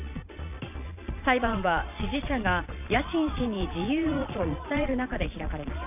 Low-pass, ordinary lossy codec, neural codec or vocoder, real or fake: 3.6 kHz; none; vocoder, 22.05 kHz, 80 mel bands, Vocos; fake